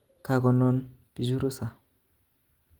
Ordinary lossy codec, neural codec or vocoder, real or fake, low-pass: Opus, 24 kbps; none; real; 19.8 kHz